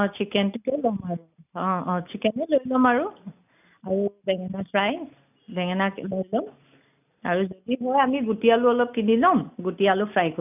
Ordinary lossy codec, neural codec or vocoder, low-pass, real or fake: none; none; 3.6 kHz; real